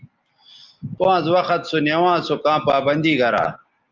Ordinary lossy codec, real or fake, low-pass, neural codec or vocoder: Opus, 24 kbps; real; 7.2 kHz; none